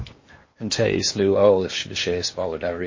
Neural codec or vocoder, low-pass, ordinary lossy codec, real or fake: codec, 16 kHz in and 24 kHz out, 0.8 kbps, FocalCodec, streaming, 65536 codes; 7.2 kHz; MP3, 32 kbps; fake